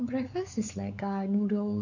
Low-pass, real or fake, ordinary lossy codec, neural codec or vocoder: 7.2 kHz; fake; none; codec, 16 kHz, 4 kbps, X-Codec, WavLM features, trained on Multilingual LibriSpeech